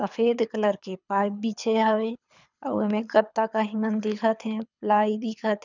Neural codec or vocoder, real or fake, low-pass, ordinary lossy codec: codec, 24 kHz, 6 kbps, HILCodec; fake; 7.2 kHz; none